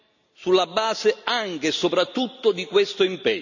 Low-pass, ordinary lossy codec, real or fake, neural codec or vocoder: 7.2 kHz; none; real; none